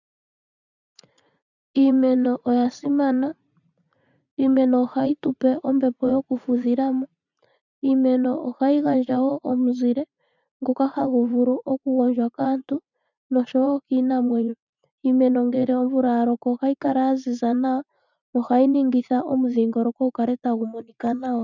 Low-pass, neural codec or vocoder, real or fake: 7.2 kHz; vocoder, 44.1 kHz, 80 mel bands, Vocos; fake